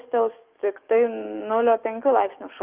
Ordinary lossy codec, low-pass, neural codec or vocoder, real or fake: Opus, 32 kbps; 3.6 kHz; codec, 44.1 kHz, 7.8 kbps, DAC; fake